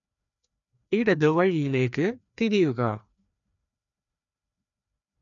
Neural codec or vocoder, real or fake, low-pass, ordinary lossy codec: codec, 16 kHz, 2 kbps, FreqCodec, larger model; fake; 7.2 kHz; none